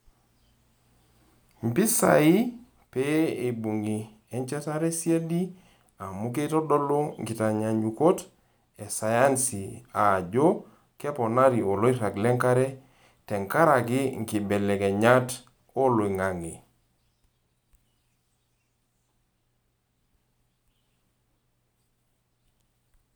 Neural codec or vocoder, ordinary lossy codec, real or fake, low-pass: none; none; real; none